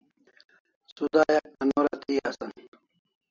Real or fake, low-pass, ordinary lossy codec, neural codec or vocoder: real; 7.2 kHz; AAC, 48 kbps; none